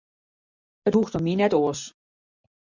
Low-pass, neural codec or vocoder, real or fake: 7.2 kHz; none; real